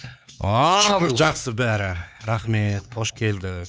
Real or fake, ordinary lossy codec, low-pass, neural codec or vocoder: fake; none; none; codec, 16 kHz, 4 kbps, X-Codec, HuBERT features, trained on LibriSpeech